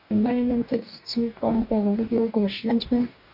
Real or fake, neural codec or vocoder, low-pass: fake; codec, 44.1 kHz, 2.6 kbps, DAC; 5.4 kHz